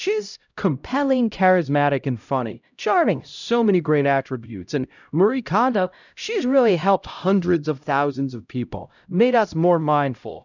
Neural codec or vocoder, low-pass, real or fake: codec, 16 kHz, 0.5 kbps, X-Codec, HuBERT features, trained on LibriSpeech; 7.2 kHz; fake